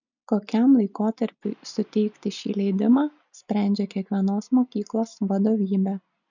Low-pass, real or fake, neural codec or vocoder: 7.2 kHz; real; none